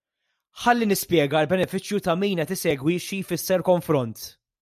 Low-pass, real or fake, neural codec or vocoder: 14.4 kHz; real; none